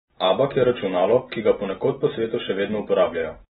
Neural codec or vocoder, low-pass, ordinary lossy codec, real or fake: none; 19.8 kHz; AAC, 16 kbps; real